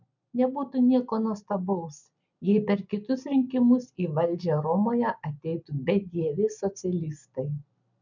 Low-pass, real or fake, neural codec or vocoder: 7.2 kHz; real; none